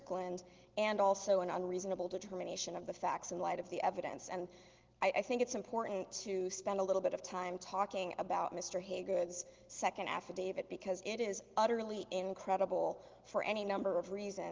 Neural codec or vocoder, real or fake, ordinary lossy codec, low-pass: none; real; Opus, 16 kbps; 7.2 kHz